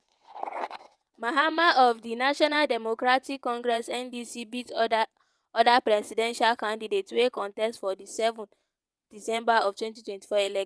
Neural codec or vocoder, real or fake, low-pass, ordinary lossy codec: vocoder, 22.05 kHz, 80 mel bands, WaveNeXt; fake; none; none